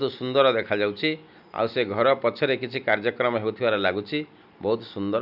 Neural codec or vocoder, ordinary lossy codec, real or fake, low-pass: none; none; real; 5.4 kHz